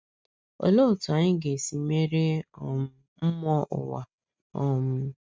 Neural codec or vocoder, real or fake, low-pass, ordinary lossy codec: none; real; none; none